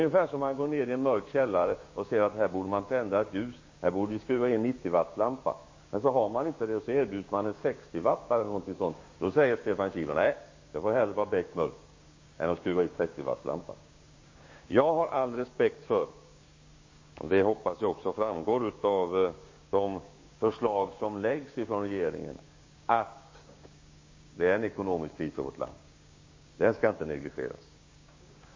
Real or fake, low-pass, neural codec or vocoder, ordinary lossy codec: fake; 7.2 kHz; codec, 16 kHz, 6 kbps, DAC; MP3, 32 kbps